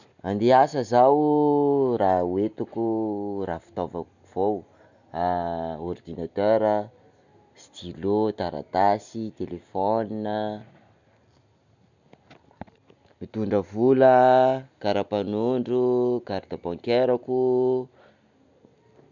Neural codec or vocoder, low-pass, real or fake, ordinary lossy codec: none; 7.2 kHz; real; none